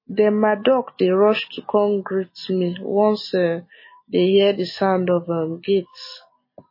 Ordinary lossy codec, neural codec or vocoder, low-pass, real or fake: MP3, 24 kbps; none; 5.4 kHz; real